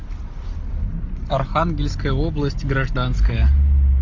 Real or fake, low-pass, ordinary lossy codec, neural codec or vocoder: real; 7.2 kHz; MP3, 48 kbps; none